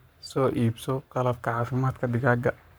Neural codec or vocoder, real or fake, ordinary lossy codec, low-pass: codec, 44.1 kHz, 7.8 kbps, Pupu-Codec; fake; none; none